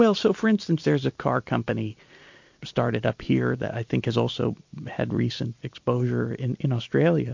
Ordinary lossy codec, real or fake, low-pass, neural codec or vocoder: MP3, 48 kbps; real; 7.2 kHz; none